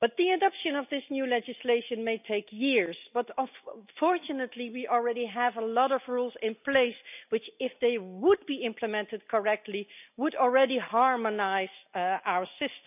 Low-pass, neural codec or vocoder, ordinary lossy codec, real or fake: 3.6 kHz; none; none; real